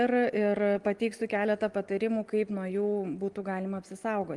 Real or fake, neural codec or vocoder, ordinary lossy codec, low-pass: real; none; Opus, 24 kbps; 10.8 kHz